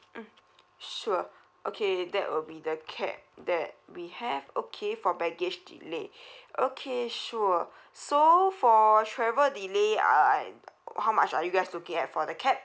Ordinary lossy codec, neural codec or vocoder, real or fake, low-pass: none; none; real; none